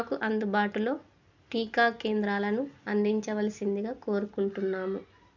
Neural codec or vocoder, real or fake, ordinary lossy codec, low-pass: none; real; none; 7.2 kHz